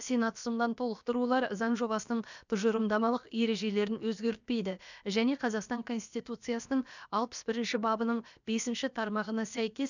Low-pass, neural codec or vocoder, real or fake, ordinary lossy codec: 7.2 kHz; codec, 16 kHz, about 1 kbps, DyCAST, with the encoder's durations; fake; none